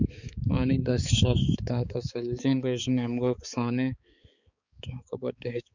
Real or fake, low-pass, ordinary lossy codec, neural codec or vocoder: fake; 7.2 kHz; Opus, 64 kbps; codec, 16 kHz, 4 kbps, X-Codec, HuBERT features, trained on balanced general audio